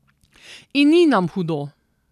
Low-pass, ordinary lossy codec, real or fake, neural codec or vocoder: 14.4 kHz; none; real; none